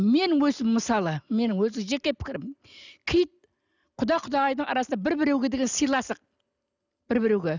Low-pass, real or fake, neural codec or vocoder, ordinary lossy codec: 7.2 kHz; real; none; none